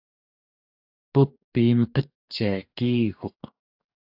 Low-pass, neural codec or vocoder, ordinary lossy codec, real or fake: 5.4 kHz; codec, 44.1 kHz, 2.6 kbps, DAC; AAC, 48 kbps; fake